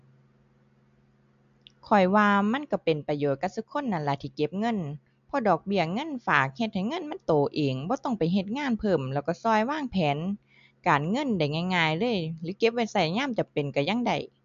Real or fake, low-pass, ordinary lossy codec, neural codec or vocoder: real; 7.2 kHz; MP3, 64 kbps; none